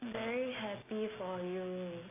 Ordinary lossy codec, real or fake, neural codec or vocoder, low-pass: AAC, 16 kbps; real; none; 3.6 kHz